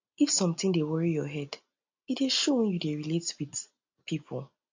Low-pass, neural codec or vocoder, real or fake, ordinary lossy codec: 7.2 kHz; none; real; AAC, 48 kbps